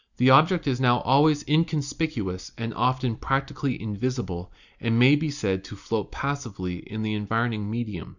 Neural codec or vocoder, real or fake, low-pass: none; real; 7.2 kHz